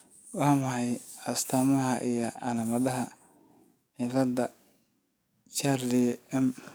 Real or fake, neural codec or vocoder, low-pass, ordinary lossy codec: fake; codec, 44.1 kHz, 7.8 kbps, DAC; none; none